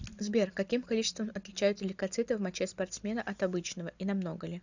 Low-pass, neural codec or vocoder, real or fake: 7.2 kHz; none; real